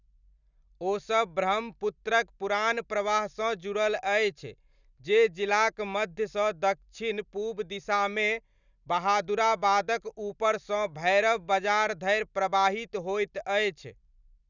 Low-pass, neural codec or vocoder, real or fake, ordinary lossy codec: 7.2 kHz; none; real; none